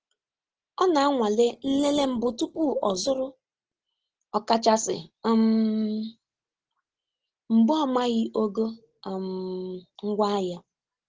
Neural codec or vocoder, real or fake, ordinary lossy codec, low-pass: none; real; Opus, 16 kbps; 7.2 kHz